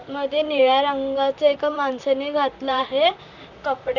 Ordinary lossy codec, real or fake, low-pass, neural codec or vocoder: none; fake; 7.2 kHz; vocoder, 44.1 kHz, 128 mel bands, Pupu-Vocoder